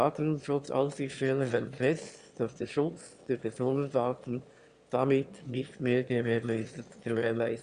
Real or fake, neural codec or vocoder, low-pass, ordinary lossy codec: fake; autoencoder, 22.05 kHz, a latent of 192 numbers a frame, VITS, trained on one speaker; 9.9 kHz; Opus, 64 kbps